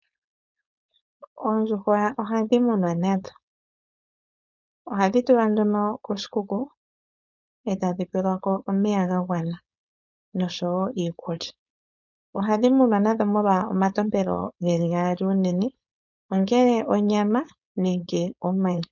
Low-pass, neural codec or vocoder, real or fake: 7.2 kHz; codec, 16 kHz, 4.8 kbps, FACodec; fake